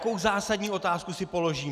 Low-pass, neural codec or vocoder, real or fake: 14.4 kHz; none; real